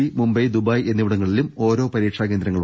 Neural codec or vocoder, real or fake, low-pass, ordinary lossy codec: none; real; none; none